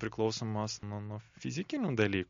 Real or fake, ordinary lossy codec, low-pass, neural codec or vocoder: real; MP3, 48 kbps; 7.2 kHz; none